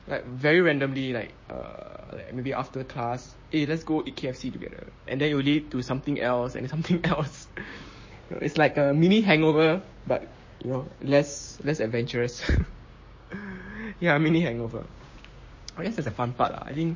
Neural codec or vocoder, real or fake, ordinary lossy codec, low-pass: codec, 16 kHz, 6 kbps, DAC; fake; MP3, 32 kbps; 7.2 kHz